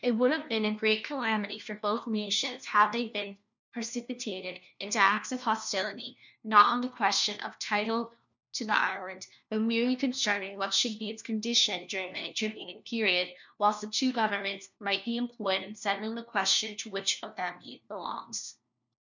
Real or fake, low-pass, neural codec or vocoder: fake; 7.2 kHz; codec, 16 kHz, 1 kbps, FunCodec, trained on LibriTTS, 50 frames a second